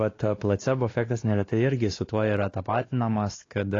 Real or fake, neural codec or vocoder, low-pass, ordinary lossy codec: fake; codec, 16 kHz, 2 kbps, X-Codec, WavLM features, trained on Multilingual LibriSpeech; 7.2 kHz; AAC, 32 kbps